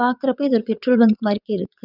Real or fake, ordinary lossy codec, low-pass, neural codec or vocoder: fake; none; 5.4 kHz; vocoder, 22.05 kHz, 80 mel bands, WaveNeXt